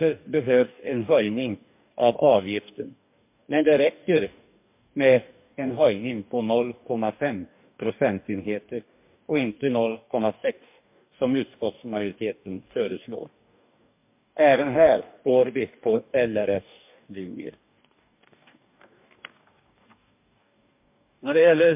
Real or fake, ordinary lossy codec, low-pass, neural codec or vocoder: fake; MP3, 32 kbps; 3.6 kHz; codec, 44.1 kHz, 2.6 kbps, DAC